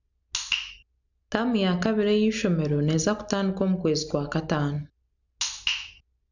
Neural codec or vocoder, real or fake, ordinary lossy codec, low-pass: none; real; none; 7.2 kHz